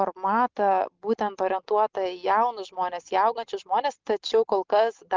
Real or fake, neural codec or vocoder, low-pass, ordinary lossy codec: real; none; 7.2 kHz; Opus, 32 kbps